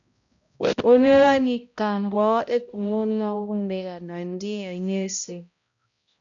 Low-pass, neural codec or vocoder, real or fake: 7.2 kHz; codec, 16 kHz, 0.5 kbps, X-Codec, HuBERT features, trained on balanced general audio; fake